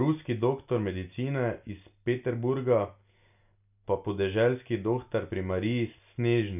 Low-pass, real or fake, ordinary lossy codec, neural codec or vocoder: 3.6 kHz; real; none; none